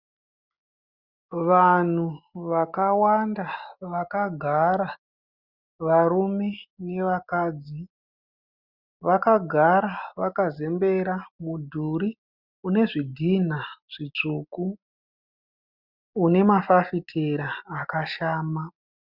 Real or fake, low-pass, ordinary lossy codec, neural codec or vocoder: real; 5.4 kHz; Opus, 64 kbps; none